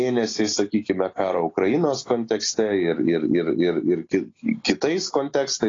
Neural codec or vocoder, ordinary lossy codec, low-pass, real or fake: none; AAC, 32 kbps; 7.2 kHz; real